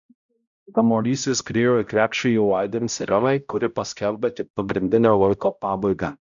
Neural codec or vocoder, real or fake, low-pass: codec, 16 kHz, 0.5 kbps, X-Codec, HuBERT features, trained on balanced general audio; fake; 7.2 kHz